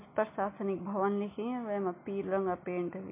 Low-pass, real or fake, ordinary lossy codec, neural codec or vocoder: 3.6 kHz; real; MP3, 24 kbps; none